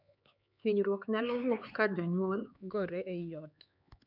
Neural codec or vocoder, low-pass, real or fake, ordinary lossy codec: codec, 16 kHz, 4 kbps, X-Codec, HuBERT features, trained on LibriSpeech; 5.4 kHz; fake; none